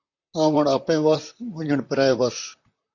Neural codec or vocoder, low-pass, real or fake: vocoder, 44.1 kHz, 128 mel bands, Pupu-Vocoder; 7.2 kHz; fake